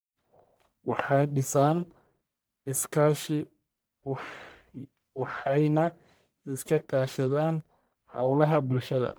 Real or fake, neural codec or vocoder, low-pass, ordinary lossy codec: fake; codec, 44.1 kHz, 1.7 kbps, Pupu-Codec; none; none